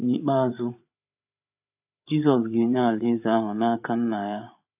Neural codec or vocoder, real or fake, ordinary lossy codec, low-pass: codec, 16 kHz, 8 kbps, FreqCodec, larger model; fake; AAC, 32 kbps; 3.6 kHz